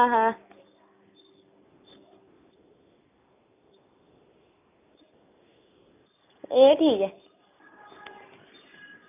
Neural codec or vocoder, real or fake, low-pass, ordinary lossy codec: none; real; 3.6 kHz; none